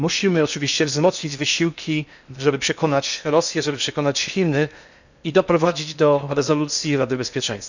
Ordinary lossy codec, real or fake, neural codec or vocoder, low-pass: none; fake; codec, 16 kHz in and 24 kHz out, 0.8 kbps, FocalCodec, streaming, 65536 codes; 7.2 kHz